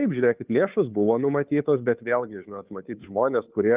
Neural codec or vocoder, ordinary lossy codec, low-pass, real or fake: codec, 16 kHz, 2 kbps, FunCodec, trained on Chinese and English, 25 frames a second; Opus, 24 kbps; 3.6 kHz; fake